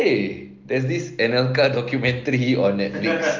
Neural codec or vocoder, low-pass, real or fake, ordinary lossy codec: none; 7.2 kHz; real; Opus, 24 kbps